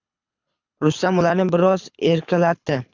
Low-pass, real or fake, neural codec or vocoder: 7.2 kHz; fake; codec, 24 kHz, 6 kbps, HILCodec